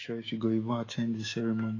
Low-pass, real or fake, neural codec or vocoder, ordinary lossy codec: 7.2 kHz; fake; autoencoder, 48 kHz, 128 numbers a frame, DAC-VAE, trained on Japanese speech; AAC, 32 kbps